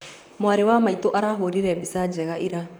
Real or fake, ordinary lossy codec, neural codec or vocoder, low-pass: fake; none; vocoder, 44.1 kHz, 128 mel bands, Pupu-Vocoder; 19.8 kHz